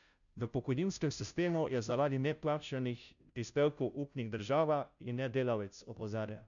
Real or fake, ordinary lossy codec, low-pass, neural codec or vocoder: fake; none; 7.2 kHz; codec, 16 kHz, 0.5 kbps, FunCodec, trained on Chinese and English, 25 frames a second